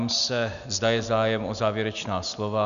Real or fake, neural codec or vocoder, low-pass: real; none; 7.2 kHz